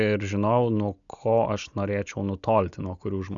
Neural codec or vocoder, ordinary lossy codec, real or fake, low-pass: none; Opus, 64 kbps; real; 7.2 kHz